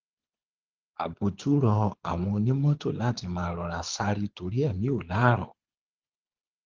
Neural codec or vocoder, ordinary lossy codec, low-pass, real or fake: codec, 24 kHz, 6 kbps, HILCodec; Opus, 16 kbps; 7.2 kHz; fake